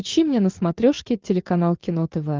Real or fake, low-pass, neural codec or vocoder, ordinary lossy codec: real; 7.2 kHz; none; Opus, 32 kbps